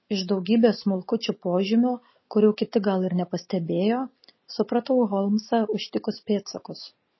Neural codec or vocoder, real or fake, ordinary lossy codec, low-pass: none; real; MP3, 24 kbps; 7.2 kHz